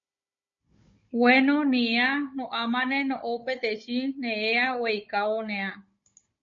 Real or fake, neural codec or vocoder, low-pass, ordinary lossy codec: fake; codec, 16 kHz, 16 kbps, FunCodec, trained on Chinese and English, 50 frames a second; 7.2 kHz; MP3, 32 kbps